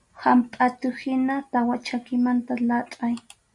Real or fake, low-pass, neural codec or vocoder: real; 10.8 kHz; none